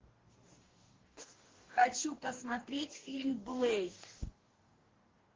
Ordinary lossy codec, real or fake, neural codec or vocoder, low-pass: Opus, 16 kbps; fake; codec, 44.1 kHz, 2.6 kbps, DAC; 7.2 kHz